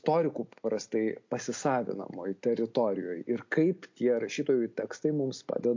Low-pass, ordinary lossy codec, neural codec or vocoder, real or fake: 7.2 kHz; MP3, 48 kbps; none; real